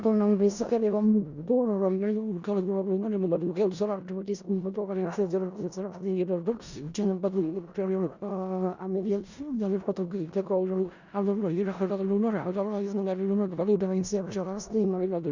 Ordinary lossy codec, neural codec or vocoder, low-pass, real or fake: Opus, 64 kbps; codec, 16 kHz in and 24 kHz out, 0.4 kbps, LongCat-Audio-Codec, four codebook decoder; 7.2 kHz; fake